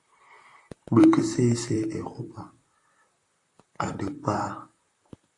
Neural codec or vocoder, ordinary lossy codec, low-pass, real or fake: vocoder, 44.1 kHz, 128 mel bands, Pupu-Vocoder; AAC, 48 kbps; 10.8 kHz; fake